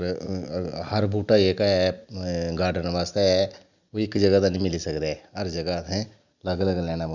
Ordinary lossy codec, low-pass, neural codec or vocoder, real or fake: none; 7.2 kHz; none; real